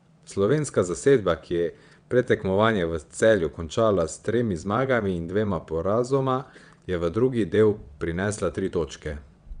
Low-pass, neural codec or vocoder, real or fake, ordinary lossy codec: 9.9 kHz; vocoder, 22.05 kHz, 80 mel bands, WaveNeXt; fake; Opus, 64 kbps